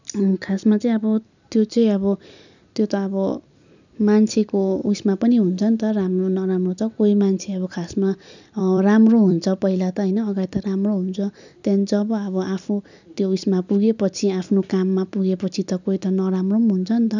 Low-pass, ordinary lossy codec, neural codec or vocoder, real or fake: 7.2 kHz; none; vocoder, 44.1 kHz, 128 mel bands every 256 samples, BigVGAN v2; fake